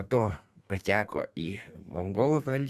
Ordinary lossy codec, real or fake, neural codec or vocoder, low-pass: Opus, 64 kbps; fake; codec, 32 kHz, 1.9 kbps, SNAC; 14.4 kHz